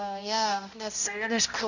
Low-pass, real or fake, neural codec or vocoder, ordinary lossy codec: 7.2 kHz; fake; codec, 16 kHz, 0.5 kbps, X-Codec, HuBERT features, trained on balanced general audio; none